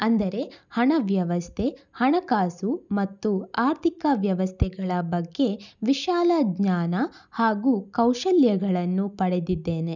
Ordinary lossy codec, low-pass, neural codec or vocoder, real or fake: none; 7.2 kHz; none; real